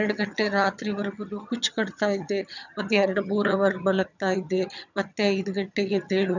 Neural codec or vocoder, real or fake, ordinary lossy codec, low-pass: vocoder, 22.05 kHz, 80 mel bands, HiFi-GAN; fake; none; 7.2 kHz